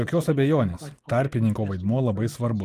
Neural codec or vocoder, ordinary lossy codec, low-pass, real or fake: none; Opus, 32 kbps; 14.4 kHz; real